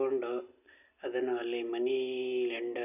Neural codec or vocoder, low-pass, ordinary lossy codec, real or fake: none; 3.6 kHz; none; real